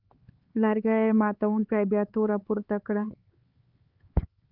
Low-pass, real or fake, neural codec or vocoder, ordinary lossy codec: 5.4 kHz; fake; codec, 16 kHz, 4 kbps, X-Codec, HuBERT features, trained on LibriSpeech; Opus, 32 kbps